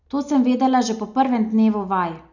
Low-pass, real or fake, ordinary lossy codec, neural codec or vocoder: 7.2 kHz; real; none; none